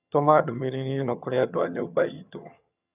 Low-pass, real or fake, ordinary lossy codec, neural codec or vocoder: 3.6 kHz; fake; none; vocoder, 22.05 kHz, 80 mel bands, HiFi-GAN